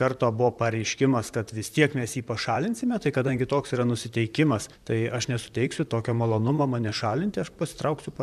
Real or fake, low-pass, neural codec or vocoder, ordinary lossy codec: fake; 14.4 kHz; vocoder, 44.1 kHz, 128 mel bands, Pupu-Vocoder; MP3, 96 kbps